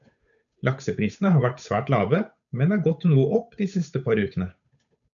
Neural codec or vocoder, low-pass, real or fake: codec, 16 kHz, 8 kbps, FunCodec, trained on Chinese and English, 25 frames a second; 7.2 kHz; fake